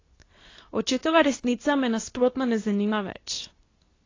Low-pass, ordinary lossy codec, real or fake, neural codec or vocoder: 7.2 kHz; AAC, 32 kbps; fake; codec, 24 kHz, 0.9 kbps, WavTokenizer, medium speech release version 2